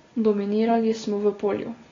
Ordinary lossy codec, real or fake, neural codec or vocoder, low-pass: AAC, 32 kbps; real; none; 7.2 kHz